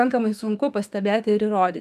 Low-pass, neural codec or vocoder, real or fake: 14.4 kHz; autoencoder, 48 kHz, 32 numbers a frame, DAC-VAE, trained on Japanese speech; fake